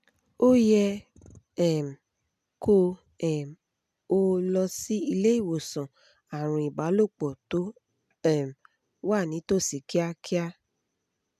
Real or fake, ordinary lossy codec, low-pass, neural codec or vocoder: real; none; 14.4 kHz; none